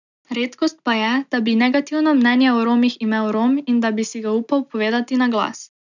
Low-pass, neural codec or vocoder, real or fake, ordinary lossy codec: 7.2 kHz; none; real; none